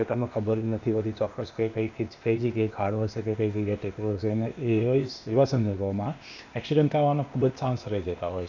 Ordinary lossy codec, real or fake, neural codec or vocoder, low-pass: none; fake; codec, 16 kHz, 0.8 kbps, ZipCodec; 7.2 kHz